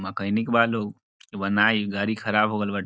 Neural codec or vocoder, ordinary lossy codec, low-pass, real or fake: none; none; none; real